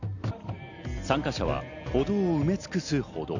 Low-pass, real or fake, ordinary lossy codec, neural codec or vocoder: 7.2 kHz; real; none; none